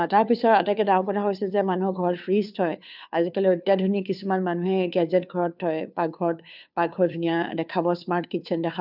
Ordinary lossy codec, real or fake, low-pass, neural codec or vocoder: none; fake; 5.4 kHz; codec, 16 kHz, 2 kbps, FunCodec, trained on Chinese and English, 25 frames a second